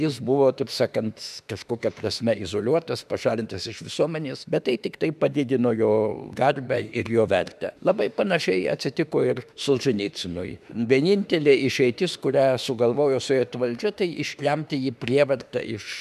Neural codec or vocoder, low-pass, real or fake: autoencoder, 48 kHz, 32 numbers a frame, DAC-VAE, trained on Japanese speech; 14.4 kHz; fake